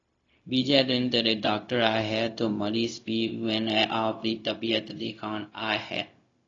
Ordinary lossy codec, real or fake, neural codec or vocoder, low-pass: AAC, 32 kbps; fake; codec, 16 kHz, 0.4 kbps, LongCat-Audio-Codec; 7.2 kHz